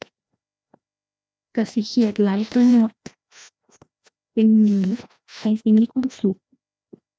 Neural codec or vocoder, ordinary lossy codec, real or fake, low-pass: codec, 16 kHz, 1 kbps, FreqCodec, larger model; none; fake; none